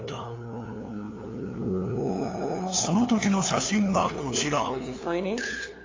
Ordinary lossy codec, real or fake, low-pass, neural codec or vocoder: AAC, 32 kbps; fake; 7.2 kHz; codec, 16 kHz, 2 kbps, FunCodec, trained on LibriTTS, 25 frames a second